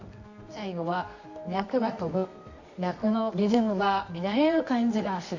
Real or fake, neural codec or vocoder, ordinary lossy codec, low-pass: fake; codec, 24 kHz, 0.9 kbps, WavTokenizer, medium music audio release; none; 7.2 kHz